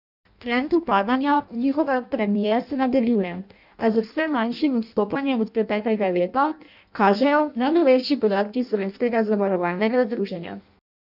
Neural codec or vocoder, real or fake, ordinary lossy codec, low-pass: codec, 16 kHz in and 24 kHz out, 0.6 kbps, FireRedTTS-2 codec; fake; none; 5.4 kHz